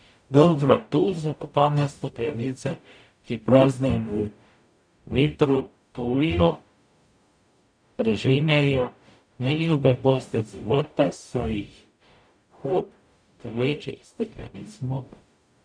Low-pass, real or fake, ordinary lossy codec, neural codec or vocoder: 9.9 kHz; fake; none; codec, 44.1 kHz, 0.9 kbps, DAC